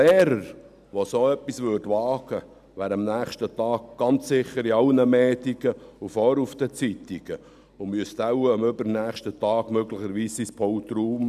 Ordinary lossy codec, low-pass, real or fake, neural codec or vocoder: none; 14.4 kHz; real; none